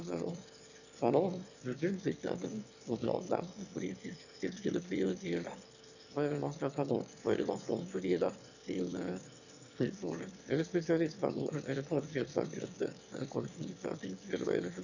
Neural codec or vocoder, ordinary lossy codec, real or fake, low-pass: autoencoder, 22.05 kHz, a latent of 192 numbers a frame, VITS, trained on one speaker; none; fake; 7.2 kHz